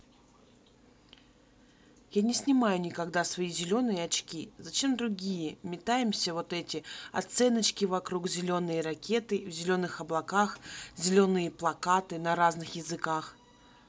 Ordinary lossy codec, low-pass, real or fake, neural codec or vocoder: none; none; real; none